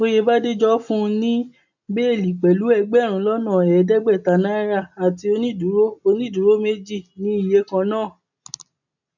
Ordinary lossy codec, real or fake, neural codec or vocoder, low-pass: none; real; none; 7.2 kHz